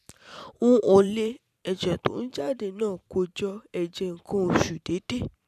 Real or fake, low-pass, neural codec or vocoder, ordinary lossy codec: fake; 14.4 kHz; vocoder, 44.1 kHz, 128 mel bands every 512 samples, BigVGAN v2; none